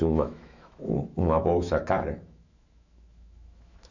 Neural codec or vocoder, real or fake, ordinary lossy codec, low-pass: autoencoder, 48 kHz, 128 numbers a frame, DAC-VAE, trained on Japanese speech; fake; none; 7.2 kHz